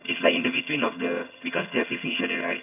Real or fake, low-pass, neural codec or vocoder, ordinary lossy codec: fake; 3.6 kHz; vocoder, 22.05 kHz, 80 mel bands, HiFi-GAN; none